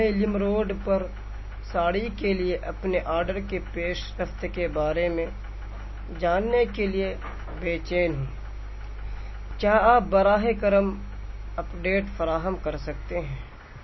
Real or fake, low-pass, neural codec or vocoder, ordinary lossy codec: real; 7.2 kHz; none; MP3, 24 kbps